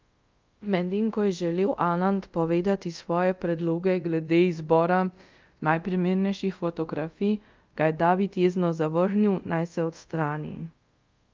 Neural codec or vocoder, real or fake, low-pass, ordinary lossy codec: codec, 24 kHz, 0.5 kbps, DualCodec; fake; 7.2 kHz; Opus, 24 kbps